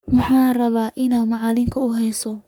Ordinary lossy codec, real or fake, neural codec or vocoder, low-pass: none; fake; codec, 44.1 kHz, 3.4 kbps, Pupu-Codec; none